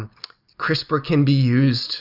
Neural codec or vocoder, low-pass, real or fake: none; 5.4 kHz; real